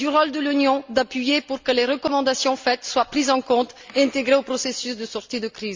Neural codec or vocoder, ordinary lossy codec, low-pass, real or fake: none; Opus, 32 kbps; 7.2 kHz; real